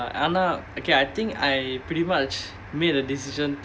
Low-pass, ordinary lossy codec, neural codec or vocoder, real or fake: none; none; none; real